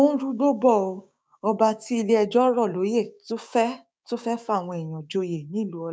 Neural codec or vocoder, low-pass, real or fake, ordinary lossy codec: codec, 16 kHz, 6 kbps, DAC; none; fake; none